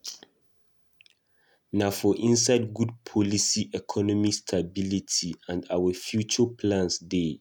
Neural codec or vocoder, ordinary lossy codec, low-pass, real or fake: none; none; 19.8 kHz; real